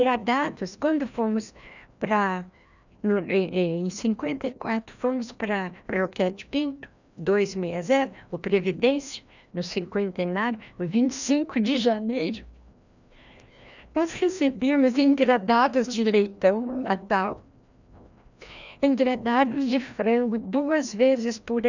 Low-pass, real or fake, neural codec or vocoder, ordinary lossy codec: 7.2 kHz; fake; codec, 16 kHz, 1 kbps, FreqCodec, larger model; none